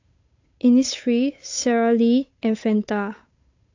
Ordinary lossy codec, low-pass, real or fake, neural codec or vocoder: AAC, 48 kbps; 7.2 kHz; fake; codec, 16 kHz, 8 kbps, FunCodec, trained on Chinese and English, 25 frames a second